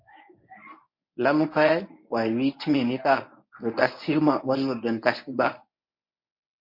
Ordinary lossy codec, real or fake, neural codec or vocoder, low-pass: MP3, 32 kbps; fake; codec, 24 kHz, 0.9 kbps, WavTokenizer, medium speech release version 1; 5.4 kHz